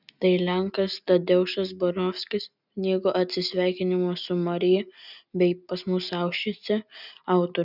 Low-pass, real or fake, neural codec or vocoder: 5.4 kHz; real; none